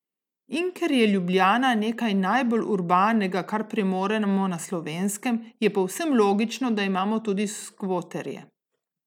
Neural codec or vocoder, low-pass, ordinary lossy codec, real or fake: none; 19.8 kHz; none; real